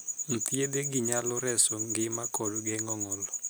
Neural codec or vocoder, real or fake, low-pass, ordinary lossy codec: none; real; none; none